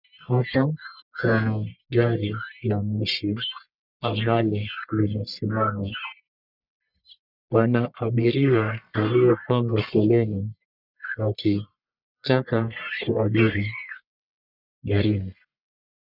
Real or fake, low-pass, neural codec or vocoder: fake; 5.4 kHz; codec, 44.1 kHz, 1.7 kbps, Pupu-Codec